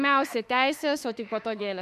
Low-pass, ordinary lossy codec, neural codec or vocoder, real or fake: 14.4 kHz; MP3, 96 kbps; autoencoder, 48 kHz, 32 numbers a frame, DAC-VAE, trained on Japanese speech; fake